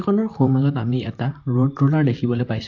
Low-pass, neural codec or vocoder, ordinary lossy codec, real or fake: 7.2 kHz; vocoder, 44.1 kHz, 128 mel bands, Pupu-Vocoder; AAC, 48 kbps; fake